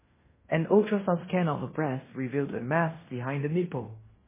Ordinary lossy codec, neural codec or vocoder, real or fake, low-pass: MP3, 16 kbps; codec, 16 kHz in and 24 kHz out, 0.9 kbps, LongCat-Audio-Codec, fine tuned four codebook decoder; fake; 3.6 kHz